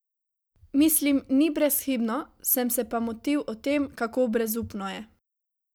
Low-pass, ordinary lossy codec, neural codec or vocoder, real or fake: none; none; none; real